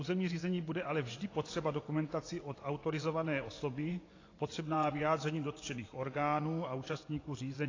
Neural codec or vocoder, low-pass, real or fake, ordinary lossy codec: vocoder, 24 kHz, 100 mel bands, Vocos; 7.2 kHz; fake; AAC, 32 kbps